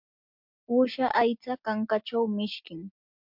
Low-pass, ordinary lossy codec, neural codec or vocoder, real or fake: 5.4 kHz; MP3, 48 kbps; none; real